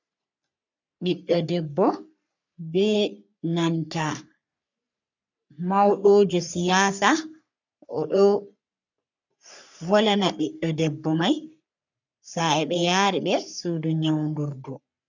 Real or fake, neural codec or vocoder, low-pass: fake; codec, 44.1 kHz, 3.4 kbps, Pupu-Codec; 7.2 kHz